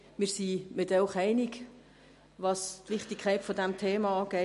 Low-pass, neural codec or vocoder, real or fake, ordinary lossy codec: 14.4 kHz; none; real; MP3, 48 kbps